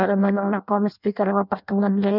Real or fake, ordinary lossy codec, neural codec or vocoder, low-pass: fake; none; codec, 16 kHz in and 24 kHz out, 0.6 kbps, FireRedTTS-2 codec; 5.4 kHz